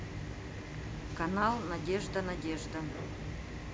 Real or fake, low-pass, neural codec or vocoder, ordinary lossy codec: real; none; none; none